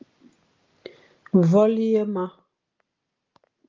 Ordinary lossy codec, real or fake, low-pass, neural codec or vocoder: Opus, 24 kbps; real; 7.2 kHz; none